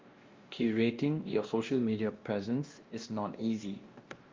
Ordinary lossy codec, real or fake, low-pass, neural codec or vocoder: Opus, 32 kbps; fake; 7.2 kHz; codec, 16 kHz, 1 kbps, X-Codec, WavLM features, trained on Multilingual LibriSpeech